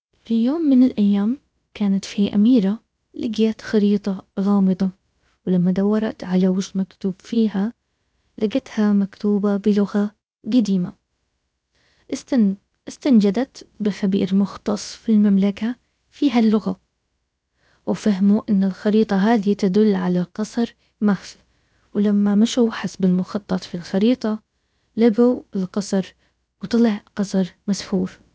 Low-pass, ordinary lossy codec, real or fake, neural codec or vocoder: none; none; fake; codec, 16 kHz, about 1 kbps, DyCAST, with the encoder's durations